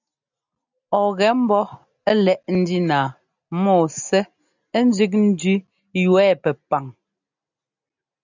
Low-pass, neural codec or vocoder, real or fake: 7.2 kHz; none; real